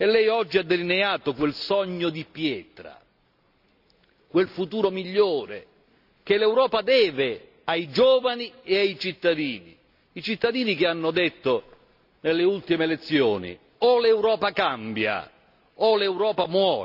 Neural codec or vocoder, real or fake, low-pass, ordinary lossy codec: none; real; 5.4 kHz; none